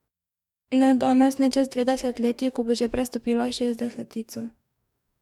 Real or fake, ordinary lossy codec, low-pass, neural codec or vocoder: fake; none; 19.8 kHz; codec, 44.1 kHz, 2.6 kbps, DAC